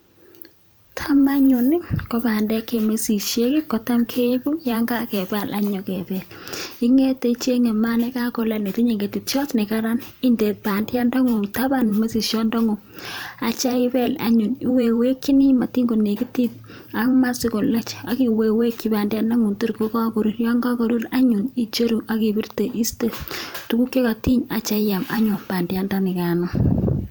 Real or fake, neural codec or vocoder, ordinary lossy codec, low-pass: fake; vocoder, 44.1 kHz, 128 mel bands every 512 samples, BigVGAN v2; none; none